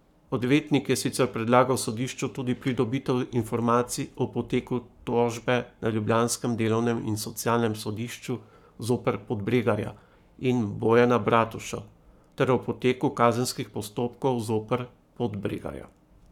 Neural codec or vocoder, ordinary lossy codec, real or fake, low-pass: codec, 44.1 kHz, 7.8 kbps, Pupu-Codec; none; fake; 19.8 kHz